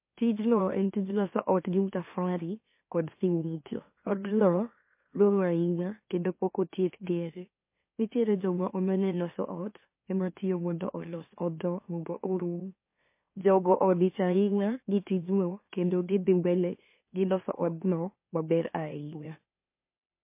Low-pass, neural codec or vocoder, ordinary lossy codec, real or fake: 3.6 kHz; autoencoder, 44.1 kHz, a latent of 192 numbers a frame, MeloTTS; MP3, 24 kbps; fake